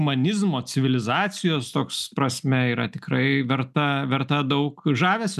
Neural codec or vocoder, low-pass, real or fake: none; 14.4 kHz; real